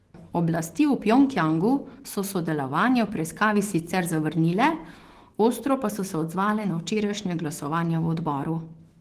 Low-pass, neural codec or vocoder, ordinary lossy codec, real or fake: 14.4 kHz; autoencoder, 48 kHz, 128 numbers a frame, DAC-VAE, trained on Japanese speech; Opus, 16 kbps; fake